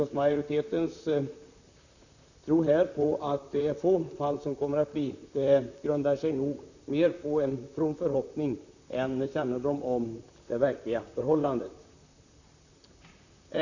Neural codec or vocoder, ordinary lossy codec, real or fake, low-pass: vocoder, 44.1 kHz, 128 mel bands, Pupu-Vocoder; none; fake; 7.2 kHz